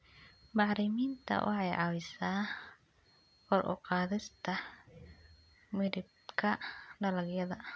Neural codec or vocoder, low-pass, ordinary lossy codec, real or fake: none; none; none; real